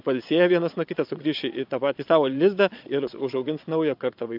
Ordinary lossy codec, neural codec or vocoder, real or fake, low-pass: AAC, 48 kbps; vocoder, 22.05 kHz, 80 mel bands, Vocos; fake; 5.4 kHz